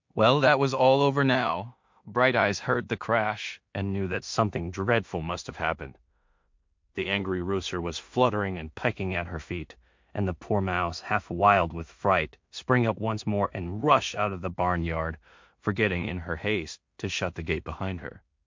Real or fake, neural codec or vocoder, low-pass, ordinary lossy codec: fake; codec, 16 kHz in and 24 kHz out, 0.4 kbps, LongCat-Audio-Codec, two codebook decoder; 7.2 kHz; MP3, 48 kbps